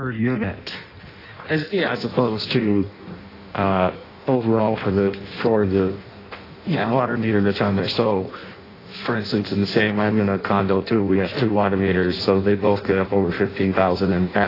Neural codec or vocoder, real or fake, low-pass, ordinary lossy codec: codec, 16 kHz in and 24 kHz out, 0.6 kbps, FireRedTTS-2 codec; fake; 5.4 kHz; AAC, 24 kbps